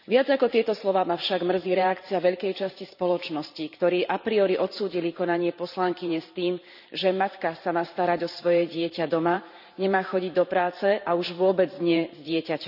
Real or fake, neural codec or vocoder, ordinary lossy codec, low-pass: fake; vocoder, 44.1 kHz, 128 mel bands every 512 samples, BigVGAN v2; none; 5.4 kHz